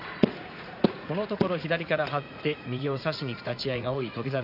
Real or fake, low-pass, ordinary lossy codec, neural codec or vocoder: fake; 5.4 kHz; none; vocoder, 44.1 kHz, 80 mel bands, Vocos